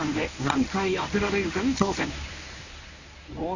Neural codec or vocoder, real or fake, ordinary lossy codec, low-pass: codec, 24 kHz, 0.9 kbps, WavTokenizer, medium speech release version 1; fake; none; 7.2 kHz